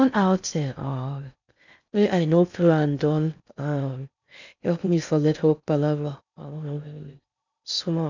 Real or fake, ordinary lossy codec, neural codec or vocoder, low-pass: fake; none; codec, 16 kHz in and 24 kHz out, 0.6 kbps, FocalCodec, streaming, 4096 codes; 7.2 kHz